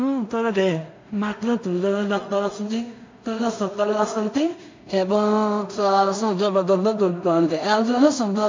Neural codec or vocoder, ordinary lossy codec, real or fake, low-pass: codec, 16 kHz in and 24 kHz out, 0.4 kbps, LongCat-Audio-Codec, two codebook decoder; MP3, 64 kbps; fake; 7.2 kHz